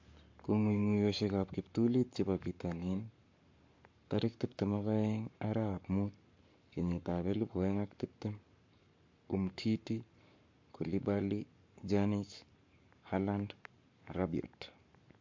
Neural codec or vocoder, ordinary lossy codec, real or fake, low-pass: codec, 44.1 kHz, 7.8 kbps, Pupu-Codec; MP3, 48 kbps; fake; 7.2 kHz